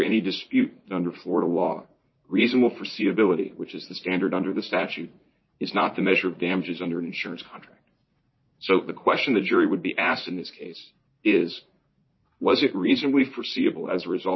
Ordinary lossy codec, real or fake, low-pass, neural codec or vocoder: MP3, 24 kbps; fake; 7.2 kHz; vocoder, 44.1 kHz, 80 mel bands, Vocos